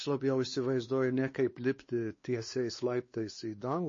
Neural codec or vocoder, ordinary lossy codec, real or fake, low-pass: codec, 16 kHz, 2 kbps, X-Codec, WavLM features, trained on Multilingual LibriSpeech; MP3, 32 kbps; fake; 7.2 kHz